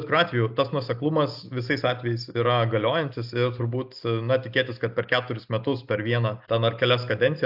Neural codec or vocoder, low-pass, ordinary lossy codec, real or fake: none; 5.4 kHz; AAC, 48 kbps; real